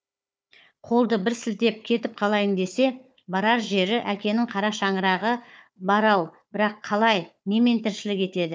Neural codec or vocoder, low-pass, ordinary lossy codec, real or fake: codec, 16 kHz, 4 kbps, FunCodec, trained on Chinese and English, 50 frames a second; none; none; fake